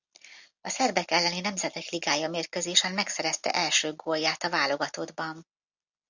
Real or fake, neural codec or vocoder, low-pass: real; none; 7.2 kHz